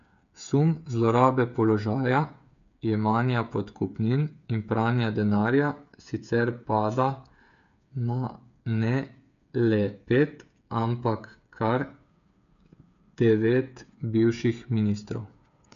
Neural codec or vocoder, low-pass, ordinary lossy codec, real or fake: codec, 16 kHz, 8 kbps, FreqCodec, smaller model; 7.2 kHz; none; fake